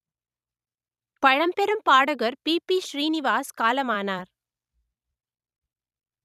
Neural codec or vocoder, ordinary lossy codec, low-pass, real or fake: vocoder, 44.1 kHz, 128 mel bands every 256 samples, BigVGAN v2; none; 14.4 kHz; fake